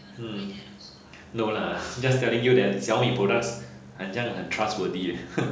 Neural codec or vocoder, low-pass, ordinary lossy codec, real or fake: none; none; none; real